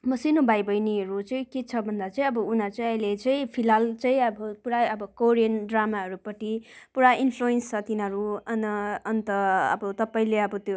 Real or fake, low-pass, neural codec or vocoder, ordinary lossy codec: real; none; none; none